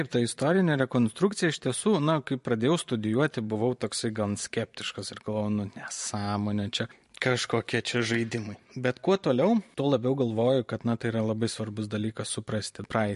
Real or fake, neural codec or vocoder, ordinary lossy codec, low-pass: fake; vocoder, 44.1 kHz, 128 mel bands every 512 samples, BigVGAN v2; MP3, 48 kbps; 14.4 kHz